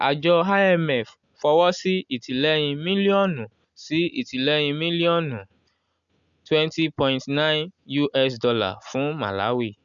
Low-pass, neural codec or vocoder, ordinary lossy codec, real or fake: 7.2 kHz; none; none; real